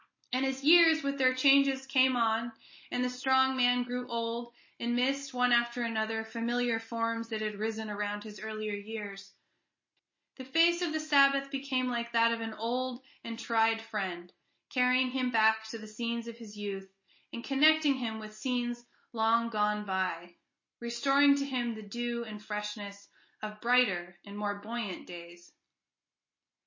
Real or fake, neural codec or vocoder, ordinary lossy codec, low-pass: real; none; MP3, 32 kbps; 7.2 kHz